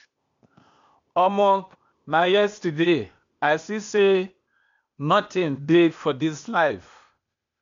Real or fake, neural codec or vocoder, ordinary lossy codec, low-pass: fake; codec, 16 kHz, 0.8 kbps, ZipCodec; MP3, 64 kbps; 7.2 kHz